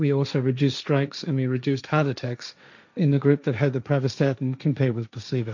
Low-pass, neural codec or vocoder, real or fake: 7.2 kHz; codec, 16 kHz, 1.1 kbps, Voila-Tokenizer; fake